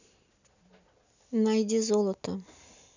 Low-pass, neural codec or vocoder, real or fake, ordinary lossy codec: 7.2 kHz; none; real; none